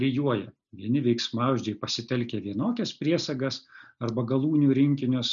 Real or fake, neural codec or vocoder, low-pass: real; none; 7.2 kHz